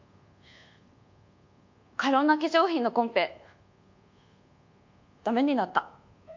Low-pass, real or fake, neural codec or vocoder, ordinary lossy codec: 7.2 kHz; fake; codec, 24 kHz, 1.2 kbps, DualCodec; MP3, 48 kbps